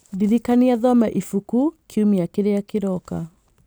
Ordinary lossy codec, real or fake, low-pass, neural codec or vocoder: none; real; none; none